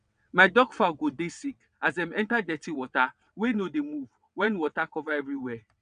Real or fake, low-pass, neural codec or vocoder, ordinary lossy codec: fake; 9.9 kHz; vocoder, 22.05 kHz, 80 mel bands, WaveNeXt; none